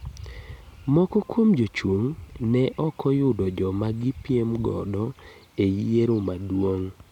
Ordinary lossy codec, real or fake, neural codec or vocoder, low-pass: none; real; none; 19.8 kHz